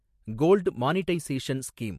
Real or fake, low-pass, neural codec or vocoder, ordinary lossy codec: real; 14.4 kHz; none; MP3, 64 kbps